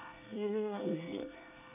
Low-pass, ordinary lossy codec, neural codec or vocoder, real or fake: 3.6 kHz; none; codec, 24 kHz, 1 kbps, SNAC; fake